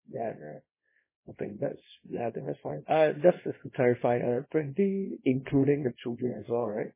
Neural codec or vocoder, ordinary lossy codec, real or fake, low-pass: codec, 24 kHz, 0.9 kbps, WavTokenizer, small release; MP3, 16 kbps; fake; 3.6 kHz